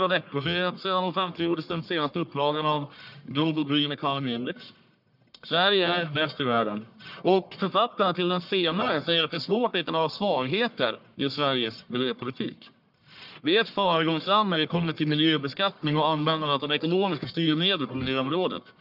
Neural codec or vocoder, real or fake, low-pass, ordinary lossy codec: codec, 44.1 kHz, 1.7 kbps, Pupu-Codec; fake; 5.4 kHz; none